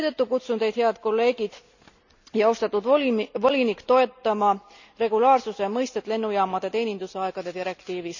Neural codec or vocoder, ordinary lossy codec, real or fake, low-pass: none; none; real; 7.2 kHz